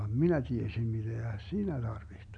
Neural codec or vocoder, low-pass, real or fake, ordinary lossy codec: none; 9.9 kHz; real; none